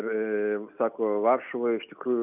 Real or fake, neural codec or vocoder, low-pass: real; none; 3.6 kHz